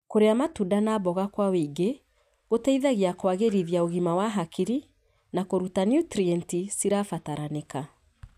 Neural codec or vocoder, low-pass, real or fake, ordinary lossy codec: none; 14.4 kHz; real; none